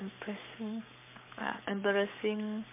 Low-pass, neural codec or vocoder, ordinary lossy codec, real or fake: 3.6 kHz; codec, 44.1 kHz, 7.8 kbps, Pupu-Codec; MP3, 32 kbps; fake